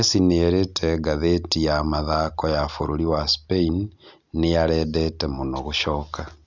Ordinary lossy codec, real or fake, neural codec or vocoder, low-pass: none; real; none; 7.2 kHz